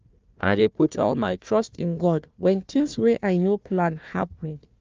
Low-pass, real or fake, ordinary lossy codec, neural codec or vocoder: 7.2 kHz; fake; Opus, 24 kbps; codec, 16 kHz, 1 kbps, FunCodec, trained on Chinese and English, 50 frames a second